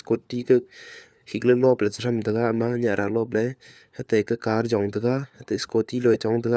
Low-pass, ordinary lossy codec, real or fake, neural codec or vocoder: none; none; fake; codec, 16 kHz, 4 kbps, FunCodec, trained on LibriTTS, 50 frames a second